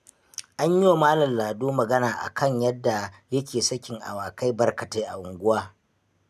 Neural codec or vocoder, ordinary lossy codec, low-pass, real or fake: none; none; 14.4 kHz; real